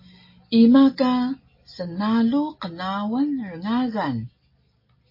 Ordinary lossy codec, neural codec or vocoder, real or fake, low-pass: MP3, 24 kbps; none; real; 5.4 kHz